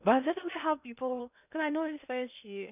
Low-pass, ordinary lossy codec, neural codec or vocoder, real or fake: 3.6 kHz; none; codec, 16 kHz in and 24 kHz out, 0.6 kbps, FocalCodec, streaming, 2048 codes; fake